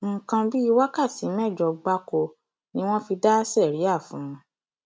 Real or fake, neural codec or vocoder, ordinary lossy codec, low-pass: real; none; none; none